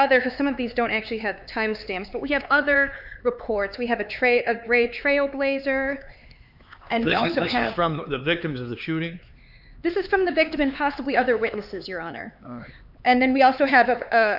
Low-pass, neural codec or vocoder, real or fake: 5.4 kHz; codec, 16 kHz, 4 kbps, X-Codec, HuBERT features, trained on LibriSpeech; fake